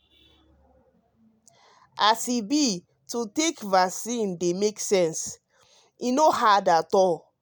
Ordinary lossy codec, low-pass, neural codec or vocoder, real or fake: none; none; none; real